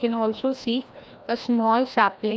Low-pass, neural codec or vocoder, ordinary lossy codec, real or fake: none; codec, 16 kHz, 1 kbps, FreqCodec, larger model; none; fake